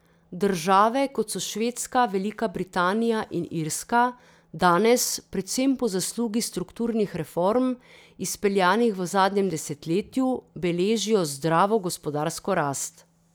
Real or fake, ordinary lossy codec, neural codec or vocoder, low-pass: real; none; none; none